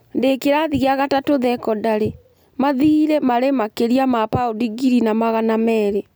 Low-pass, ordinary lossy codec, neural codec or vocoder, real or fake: none; none; none; real